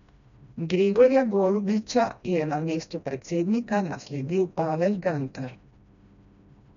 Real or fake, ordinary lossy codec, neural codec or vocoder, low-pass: fake; none; codec, 16 kHz, 1 kbps, FreqCodec, smaller model; 7.2 kHz